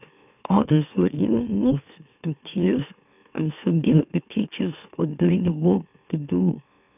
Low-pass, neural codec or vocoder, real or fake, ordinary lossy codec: 3.6 kHz; autoencoder, 44.1 kHz, a latent of 192 numbers a frame, MeloTTS; fake; none